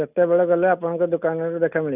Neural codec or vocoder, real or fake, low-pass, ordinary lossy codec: none; real; 3.6 kHz; none